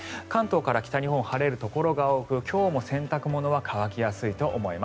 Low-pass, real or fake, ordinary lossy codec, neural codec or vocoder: none; real; none; none